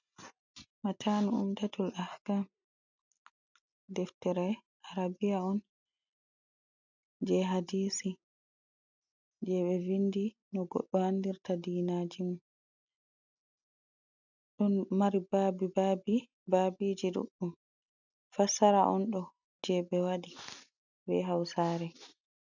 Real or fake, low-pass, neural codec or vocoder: real; 7.2 kHz; none